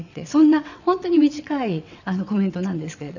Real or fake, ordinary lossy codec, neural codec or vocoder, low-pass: fake; none; vocoder, 22.05 kHz, 80 mel bands, WaveNeXt; 7.2 kHz